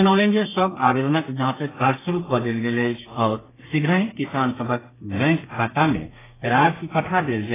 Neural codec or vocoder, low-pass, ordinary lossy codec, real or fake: codec, 32 kHz, 1.9 kbps, SNAC; 3.6 kHz; AAC, 16 kbps; fake